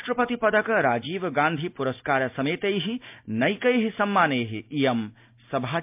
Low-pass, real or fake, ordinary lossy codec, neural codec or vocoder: 3.6 kHz; real; MP3, 32 kbps; none